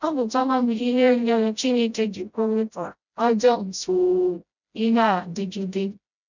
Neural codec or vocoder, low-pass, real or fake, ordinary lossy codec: codec, 16 kHz, 0.5 kbps, FreqCodec, smaller model; 7.2 kHz; fake; none